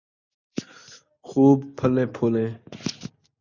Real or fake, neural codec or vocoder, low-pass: real; none; 7.2 kHz